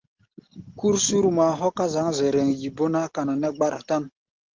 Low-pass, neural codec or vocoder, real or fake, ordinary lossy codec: 7.2 kHz; none; real; Opus, 16 kbps